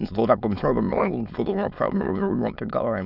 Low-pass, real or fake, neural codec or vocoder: 5.4 kHz; fake; autoencoder, 22.05 kHz, a latent of 192 numbers a frame, VITS, trained on many speakers